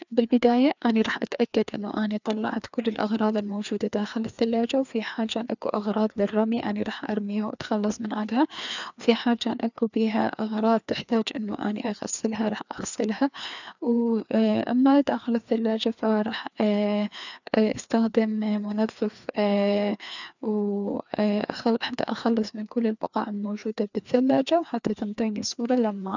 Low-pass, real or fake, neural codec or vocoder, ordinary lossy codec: 7.2 kHz; fake; codec, 16 kHz, 2 kbps, FreqCodec, larger model; none